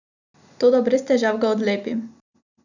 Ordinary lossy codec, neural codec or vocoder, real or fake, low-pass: none; none; real; 7.2 kHz